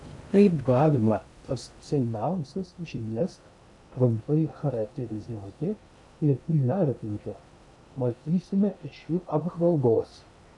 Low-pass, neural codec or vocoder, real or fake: 10.8 kHz; codec, 16 kHz in and 24 kHz out, 0.6 kbps, FocalCodec, streaming, 2048 codes; fake